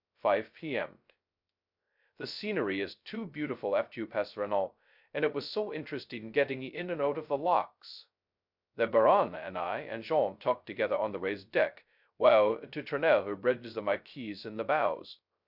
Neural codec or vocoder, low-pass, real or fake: codec, 16 kHz, 0.2 kbps, FocalCodec; 5.4 kHz; fake